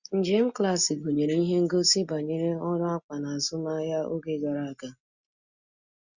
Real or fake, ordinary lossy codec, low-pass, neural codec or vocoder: real; Opus, 64 kbps; 7.2 kHz; none